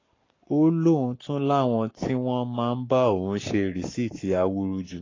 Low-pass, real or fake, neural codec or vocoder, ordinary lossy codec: 7.2 kHz; fake; codec, 44.1 kHz, 7.8 kbps, Pupu-Codec; AAC, 32 kbps